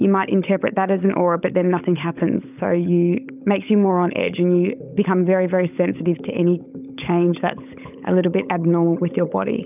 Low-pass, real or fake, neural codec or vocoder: 3.6 kHz; fake; codec, 16 kHz, 16 kbps, FunCodec, trained on LibriTTS, 50 frames a second